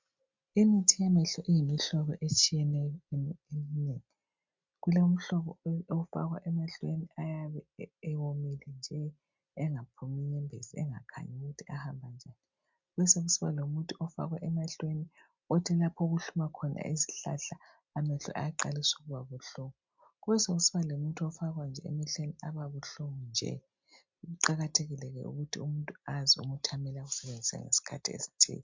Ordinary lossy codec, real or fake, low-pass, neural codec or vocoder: MP3, 64 kbps; real; 7.2 kHz; none